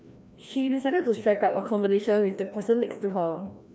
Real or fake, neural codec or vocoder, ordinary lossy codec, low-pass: fake; codec, 16 kHz, 1 kbps, FreqCodec, larger model; none; none